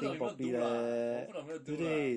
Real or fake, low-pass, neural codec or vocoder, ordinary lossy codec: real; 19.8 kHz; none; MP3, 48 kbps